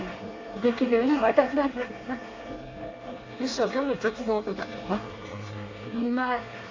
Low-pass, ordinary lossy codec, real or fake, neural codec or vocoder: 7.2 kHz; AAC, 32 kbps; fake; codec, 24 kHz, 1 kbps, SNAC